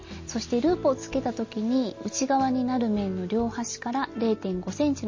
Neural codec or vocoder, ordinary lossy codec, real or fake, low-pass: none; MP3, 32 kbps; real; 7.2 kHz